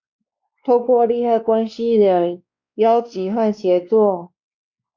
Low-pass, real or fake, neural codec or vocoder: 7.2 kHz; fake; codec, 16 kHz, 2 kbps, X-Codec, WavLM features, trained on Multilingual LibriSpeech